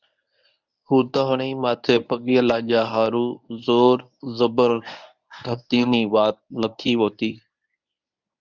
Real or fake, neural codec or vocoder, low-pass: fake; codec, 24 kHz, 0.9 kbps, WavTokenizer, medium speech release version 1; 7.2 kHz